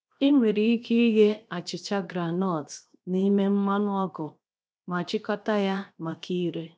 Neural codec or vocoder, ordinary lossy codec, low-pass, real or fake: codec, 16 kHz, 0.7 kbps, FocalCodec; none; none; fake